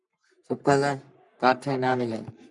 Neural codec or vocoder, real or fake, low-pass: codec, 44.1 kHz, 3.4 kbps, Pupu-Codec; fake; 10.8 kHz